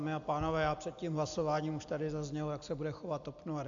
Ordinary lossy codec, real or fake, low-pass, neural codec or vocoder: AAC, 64 kbps; real; 7.2 kHz; none